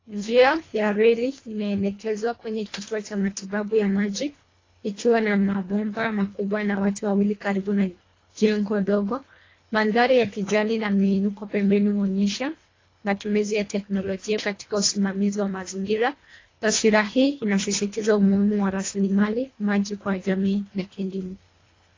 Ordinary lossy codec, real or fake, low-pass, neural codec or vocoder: AAC, 32 kbps; fake; 7.2 kHz; codec, 24 kHz, 1.5 kbps, HILCodec